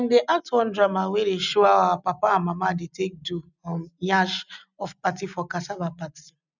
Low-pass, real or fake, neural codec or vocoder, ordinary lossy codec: 7.2 kHz; real; none; none